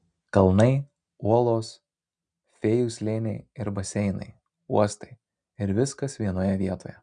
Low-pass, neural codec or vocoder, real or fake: 9.9 kHz; none; real